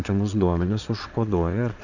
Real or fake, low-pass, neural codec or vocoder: fake; 7.2 kHz; vocoder, 22.05 kHz, 80 mel bands, Vocos